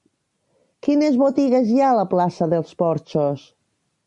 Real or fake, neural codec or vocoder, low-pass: real; none; 10.8 kHz